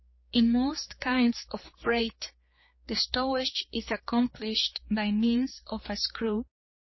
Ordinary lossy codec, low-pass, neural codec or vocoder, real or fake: MP3, 24 kbps; 7.2 kHz; codec, 16 kHz, 4 kbps, X-Codec, HuBERT features, trained on general audio; fake